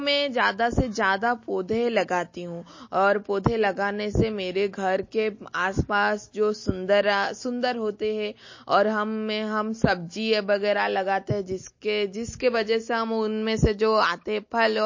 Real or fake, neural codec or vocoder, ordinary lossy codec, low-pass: real; none; MP3, 32 kbps; 7.2 kHz